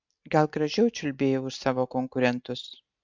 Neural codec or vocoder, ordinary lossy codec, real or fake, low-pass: none; MP3, 64 kbps; real; 7.2 kHz